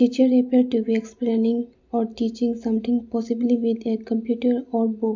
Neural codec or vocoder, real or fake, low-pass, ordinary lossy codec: none; real; 7.2 kHz; MP3, 64 kbps